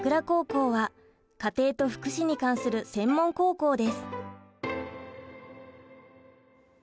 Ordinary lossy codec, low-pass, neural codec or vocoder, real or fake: none; none; none; real